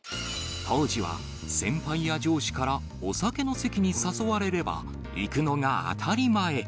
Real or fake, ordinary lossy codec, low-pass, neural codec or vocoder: real; none; none; none